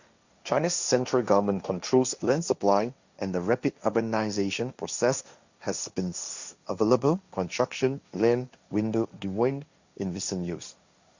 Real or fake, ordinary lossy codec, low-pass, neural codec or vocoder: fake; Opus, 64 kbps; 7.2 kHz; codec, 16 kHz, 1.1 kbps, Voila-Tokenizer